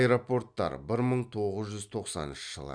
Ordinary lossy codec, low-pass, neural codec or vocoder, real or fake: none; 9.9 kHz; none; real